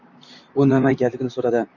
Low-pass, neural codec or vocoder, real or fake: 7.2 kHz; vocoder, 44.1 kHz, 128 mel bands, Pupu-Vocoder; fake